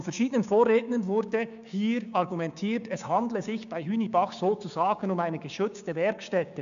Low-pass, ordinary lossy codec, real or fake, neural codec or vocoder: 7.2 kHz; none; fake; codec, 16 kHz, 6 kbps, DAC